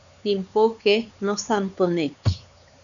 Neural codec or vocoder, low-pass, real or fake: codec, 16 kHz, 4 kbps, X-Codec, HuBERT features, trained on LibriSpeech; 7.2 kHz; fake